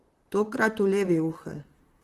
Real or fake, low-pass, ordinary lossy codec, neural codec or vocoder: fake; 14.4 kHz; Opus, 16 kbps; vocoder, 44.1 kHz, 128 mel bands, Pupu-Vocoder